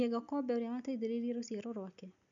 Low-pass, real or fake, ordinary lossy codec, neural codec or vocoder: 7.2 kHz; real; none; none